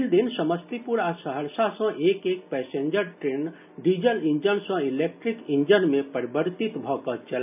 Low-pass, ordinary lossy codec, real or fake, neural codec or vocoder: 3.6 kHz; none; real; none